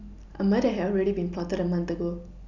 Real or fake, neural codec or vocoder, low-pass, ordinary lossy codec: real; none; 7.2 kHz; none